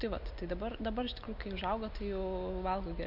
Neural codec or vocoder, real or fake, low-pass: none; real; 5.4 kHz